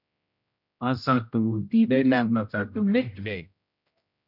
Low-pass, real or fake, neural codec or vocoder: 5.4 kHz; fake; codec, 16 kHz, 0.5 kbps, X-Codec, HuBERT features, trained on general audio